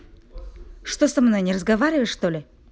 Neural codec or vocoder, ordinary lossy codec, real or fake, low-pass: none; none; real; none